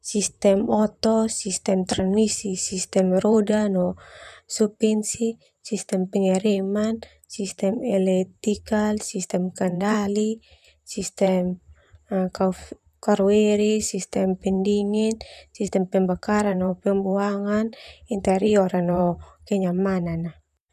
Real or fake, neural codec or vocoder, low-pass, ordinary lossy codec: fake; vocoder, 44.1 kHz, 128 mel bands, Pupu-Vocoder; 14.4 kHz; none